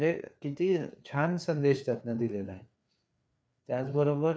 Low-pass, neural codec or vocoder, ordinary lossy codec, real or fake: none; codec, 16 kHz, 4 kbps, FreqCodec, larger model; none; fake